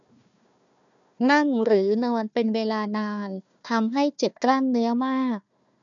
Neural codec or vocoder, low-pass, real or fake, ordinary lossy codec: codec, 16 kHz, 1 kbps, FunCodec, trained on Chinese and English, 50 frames a second; 7.2 kHz; fake; none